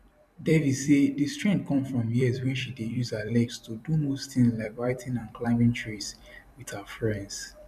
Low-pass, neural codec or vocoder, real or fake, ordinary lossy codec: 14.4 kHz; vocoder, 44.1 kHz, 128 mel bands every 256 samples, BigVGAN v2; fake; none